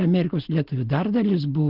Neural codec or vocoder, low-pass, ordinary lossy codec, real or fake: none; 5.4 kHz; Opus, 16 kbps; real